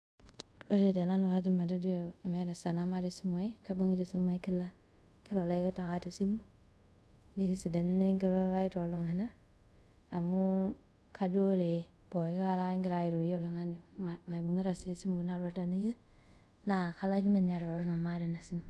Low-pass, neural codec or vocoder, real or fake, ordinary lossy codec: none; codec, 24 kHz, 0.5 kbps, DualCodec; fake; none